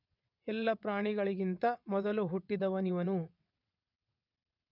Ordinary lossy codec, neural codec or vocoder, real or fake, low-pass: none; vocoder, 22.05 kHz, 80 mel bands, WaveNeXt; fake; 5.4 kHz